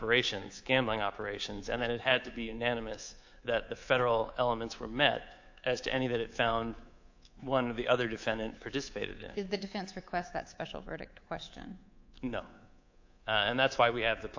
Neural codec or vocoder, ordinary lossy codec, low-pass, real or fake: codec, 24 kHz, 3.1 kbps, DualCodec; AAC, 48 kbps; 7.2 kHz; fake